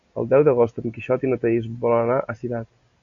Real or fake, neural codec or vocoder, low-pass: real; none; 7.2 kHz